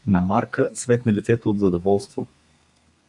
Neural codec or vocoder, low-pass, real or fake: codec, 32 kHz, 1.9 kbps, SNAC; 10.8 kHz; fake